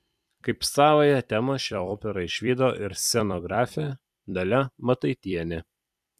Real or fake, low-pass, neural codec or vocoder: fake; 14.4 kHz; vocoder, 44.1 kHz, 128 mel bands every 256 samples, BigVGAN v2